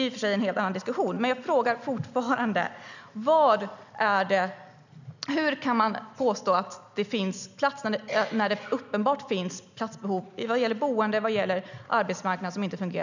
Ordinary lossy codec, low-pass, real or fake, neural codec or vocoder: none; 7.2 kHz; real; none